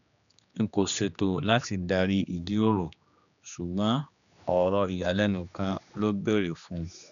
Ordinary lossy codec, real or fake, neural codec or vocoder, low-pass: none; fake; codec, 16 kHz, 2 kbps, X-Codec, HuBERT features, trained on general audio; 7.2 kHz